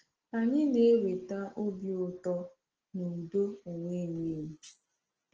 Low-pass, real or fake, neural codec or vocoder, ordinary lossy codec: 7.2 kHz; real; none; Opus, 16 kbps